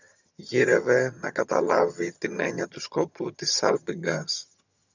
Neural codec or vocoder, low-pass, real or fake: vocoder, 22.05 kHz, 80 mel bands, HiFi-GAN; 7.2 kHz; fake